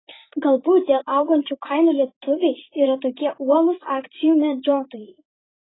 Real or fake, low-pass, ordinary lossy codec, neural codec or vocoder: fake; 7.2 kHz; AAC, 16 kbps; vocoder, 44.1 kHz, 128 mel bands, Pupu-Vocoder